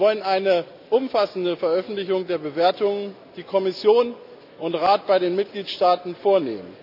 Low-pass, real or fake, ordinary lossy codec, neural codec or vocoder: 5.4 kHz; real; none; none